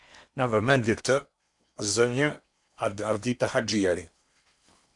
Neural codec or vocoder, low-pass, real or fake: codec, 16 kHz in and 24 kHz out, 0.8 kbps, FocalCodec, streaming, 65536 codes; 10.8 kHz; fake